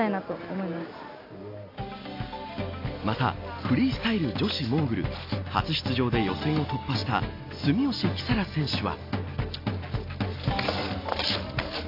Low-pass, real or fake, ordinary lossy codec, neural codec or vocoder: 5.4 kHz; real; AAC, 32 kbps; none